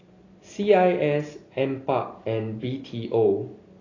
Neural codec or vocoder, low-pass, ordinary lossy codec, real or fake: none; 7.2 kHz; AAC, 32 kbps; real